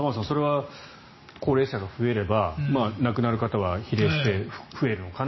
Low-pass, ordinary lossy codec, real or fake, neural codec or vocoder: 7.2 kHz; MP3, 24 kbps; real; none